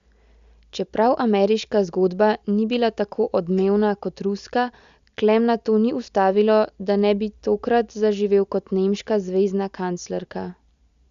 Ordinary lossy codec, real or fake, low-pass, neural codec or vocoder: Opus, 64 kbps; real; 7.2 kHz; none